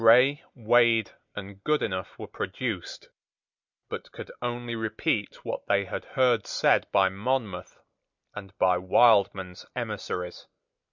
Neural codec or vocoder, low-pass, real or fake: none; 7.2 kHz; real